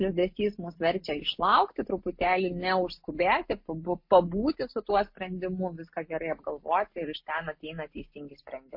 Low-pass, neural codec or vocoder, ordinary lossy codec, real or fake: 5.4 kHz; none; MP3, 32 kbps; real